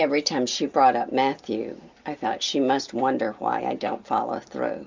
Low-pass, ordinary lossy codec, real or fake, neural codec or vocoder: 7.2 kHz; MP3, 64 kbps; real; none